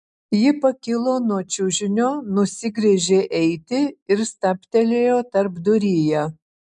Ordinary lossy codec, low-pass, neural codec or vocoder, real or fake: MP3, 96 kbps; 10.8 kHz; none; real